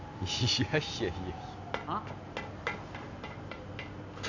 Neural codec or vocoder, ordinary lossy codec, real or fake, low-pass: none; none; real; 7.2 kHz